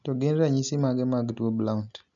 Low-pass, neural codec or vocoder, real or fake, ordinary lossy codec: 7.2 kHz; none; real; none